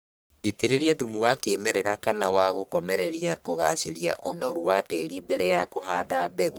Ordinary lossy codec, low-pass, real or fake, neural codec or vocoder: none; none; fake; codec, 44.1 kHz, 1.7 kbps, Pupu-Codec